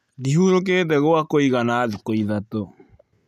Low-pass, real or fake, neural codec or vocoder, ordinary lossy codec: 14.4 kHz; real; none; none